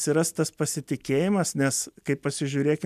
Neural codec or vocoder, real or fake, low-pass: none; real; 14.4 kHz